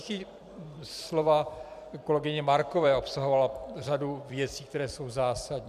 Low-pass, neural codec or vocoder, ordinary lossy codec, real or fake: 14.4 kHz; vocoder, 44.1 kHz, 128 mel bands every 256 samples, BigVGAN v2; MP3, 96 kbps; fake